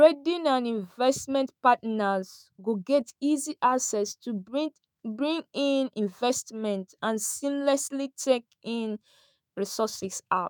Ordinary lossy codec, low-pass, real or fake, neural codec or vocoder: none; none; fake; autoencoder, 48 kHz, 128 numbers a frame, DAC-VAE, trained on Japanese speech